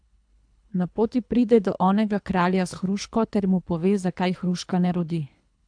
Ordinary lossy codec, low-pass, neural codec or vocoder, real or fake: AAC, 64 kbps; 9.9 kHz; codec, 24 kHz, 3 kbps, HILCodec; fake